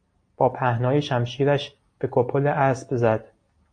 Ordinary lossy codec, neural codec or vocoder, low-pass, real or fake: AAC, 48 kbps; none; 9.9 kHz; real